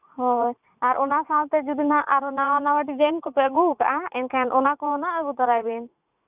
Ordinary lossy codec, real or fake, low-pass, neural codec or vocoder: none; fake; 3.6 kHz; vocoder, 44.1 kHz, 80 mel bands, Vocos